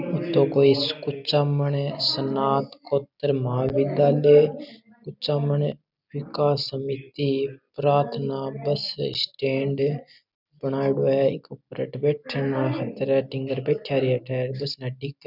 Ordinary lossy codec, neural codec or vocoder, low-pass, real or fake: none; none; 5.4 kHz; real